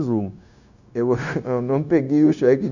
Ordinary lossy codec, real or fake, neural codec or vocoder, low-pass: none; fake; codec, 16 kHz, 0.9 kbps, LongCat-Audio-Codec; 7.2 kHz